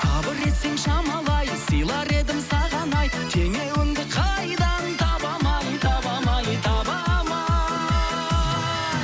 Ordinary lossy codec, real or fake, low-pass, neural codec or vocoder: none; real; none; none